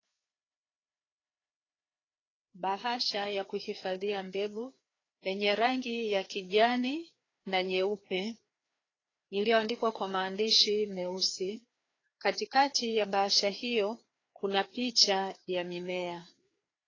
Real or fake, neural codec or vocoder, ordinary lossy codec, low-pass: fake; codec, 16 kHz, 2 kbps, FreqCodec, larger model; AAC, 32 kbps; 7.2 kHz